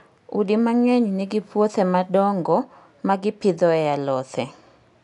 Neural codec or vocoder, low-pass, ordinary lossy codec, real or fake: none; 10.8 kHz; none; real